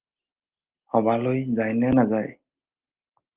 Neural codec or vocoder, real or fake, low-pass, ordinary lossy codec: none; real; 3.6 kHz; Opus, 32 kbps